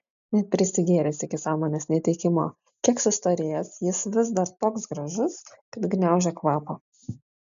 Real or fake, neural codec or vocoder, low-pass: real; none; 7.2 kHz